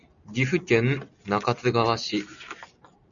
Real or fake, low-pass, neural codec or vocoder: real; 7.2 kHz; none